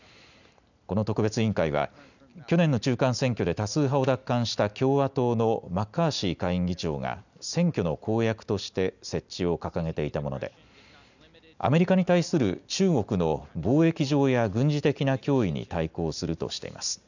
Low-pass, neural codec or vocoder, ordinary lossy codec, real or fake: 7.2 kHz; none; none; real